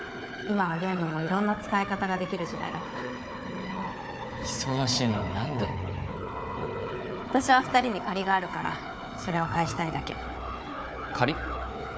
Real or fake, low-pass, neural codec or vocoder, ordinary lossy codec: fake; none; codec, 16 kHz, 4 kbps, FunCodec, trained on Chinese and English, 50 frames a second; none